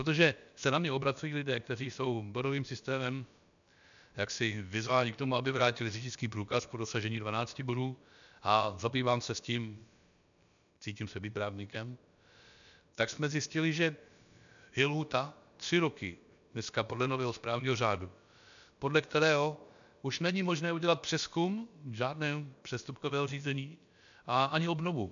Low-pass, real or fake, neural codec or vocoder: 7.2 kHz; fake; codec, 16 kHz, about 1 kbps, DyCAST, with the encoder's durations